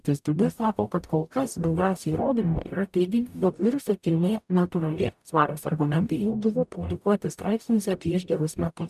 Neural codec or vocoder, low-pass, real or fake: codec, 44.1 kHz, 0.9 kbps, DAC; 14.4 kHz; fake